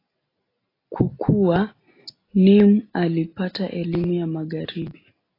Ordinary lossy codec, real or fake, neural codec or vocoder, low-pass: AAC, 24 kbps; real; none; 5.4 kHz